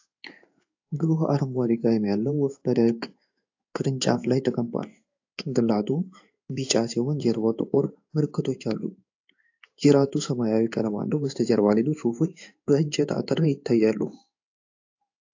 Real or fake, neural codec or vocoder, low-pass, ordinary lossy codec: fake; codec, 16 kHz in and 24 kHz out, 1 kbps, XY-Tokenizer; 7.2 kHz; AAC, 48 kbps